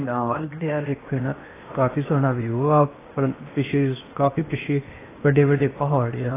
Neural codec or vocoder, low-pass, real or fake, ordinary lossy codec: codec, 16 kHz in and 24 kHz out, 0.8 kbps, FocalCodec, streaming, 65536 codes; 3.6 kHz; fake; AAC, 16 kbps